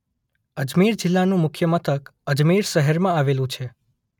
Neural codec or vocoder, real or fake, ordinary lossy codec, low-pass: none; real; none; 19.8 kHz